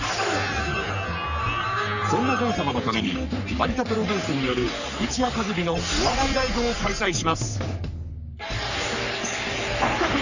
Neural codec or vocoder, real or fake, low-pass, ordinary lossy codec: codec, 44.1 kHz, 3.4 kbps, Pupu-Codec; fake; 7.2 kHz; none